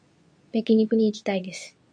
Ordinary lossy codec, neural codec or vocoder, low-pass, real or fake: MP3, 48 kbps; codec, 24 kHz, 0.9 kbps, WavTokenizer, medium speech release version 2; 9.9 kHz; fake